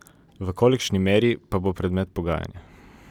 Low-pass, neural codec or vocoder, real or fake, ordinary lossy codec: 19.8 kHz; vocoder, 44.1 kHz, 128 mel bands every 256 samples, BigVGAN v2; fake; none